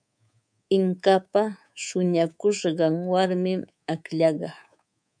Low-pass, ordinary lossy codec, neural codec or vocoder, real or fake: 9.9 kHz; MP3, 96 kbps; codec, 24 kHz, 3.1 kbps, DualCodec; fake